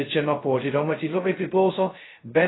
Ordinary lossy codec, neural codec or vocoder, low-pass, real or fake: AAC, 16 kbps; codec, 16 kHz, 0.2 kbps, FocalCodec; 7.2 kHz; fake